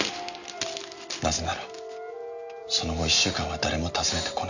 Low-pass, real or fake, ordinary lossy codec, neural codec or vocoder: 7.2 kHz; real; none; none